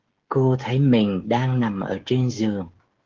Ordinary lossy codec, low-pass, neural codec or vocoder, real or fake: Opus, 16 kbps; 7.2 kHz; codec, 16 kHz, 16 kbps, FreqCodec, smaller model; fake